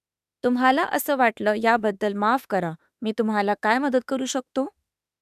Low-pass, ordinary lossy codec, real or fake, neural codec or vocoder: 14.4 kHz; none; fake; autoencoder, 48 kHz, 32 numbers a frame, DAC-VAE, trained on Japanese speech